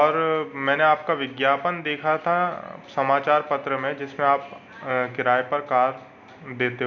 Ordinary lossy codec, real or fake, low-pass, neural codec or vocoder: none; real; 7.2 kHz; none